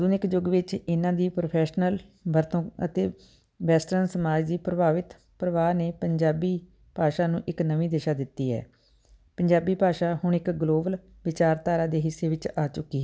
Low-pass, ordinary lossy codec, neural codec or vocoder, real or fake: none; none; none; real